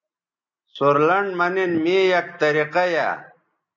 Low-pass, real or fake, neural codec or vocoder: 7.2 kHz; real; none